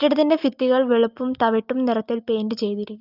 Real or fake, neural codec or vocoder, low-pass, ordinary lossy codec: real; none; 5.4 kHz; Opus, 24 kbps